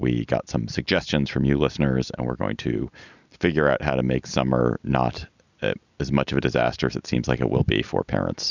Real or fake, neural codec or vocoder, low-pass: real; none; 7.2 kHz